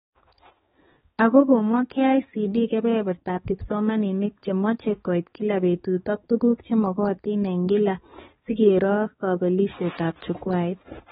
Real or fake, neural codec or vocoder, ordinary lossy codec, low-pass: fake; codec, 16 kHz, 4 kbps, X-Codec, HuBERT features, trained on balanced general audio; AAC, 16 kbps; 7.2 kHz